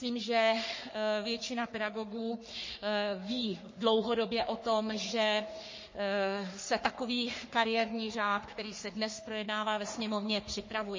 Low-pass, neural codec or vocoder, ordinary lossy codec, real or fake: 7.2 kHz; codec, 44.1 kHz, 3.4 kbps, Pupu-Codec; MP3, 32 kbps; fake